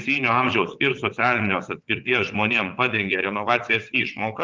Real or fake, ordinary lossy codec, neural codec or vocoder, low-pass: fake; Opus, 24 kbps; vocoder, 22.05 kHz, 80 mel bands, WaveNeXt; 7.2 kHz